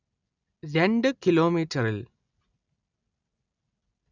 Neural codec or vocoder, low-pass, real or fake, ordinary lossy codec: none; 7.2 kHz; real; none